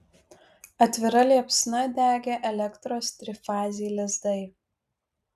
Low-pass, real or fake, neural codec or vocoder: 14.4 kHz; real; none